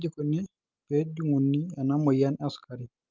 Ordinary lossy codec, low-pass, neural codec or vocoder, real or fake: Opus, 32 kbps; 7.2 kHz; none; real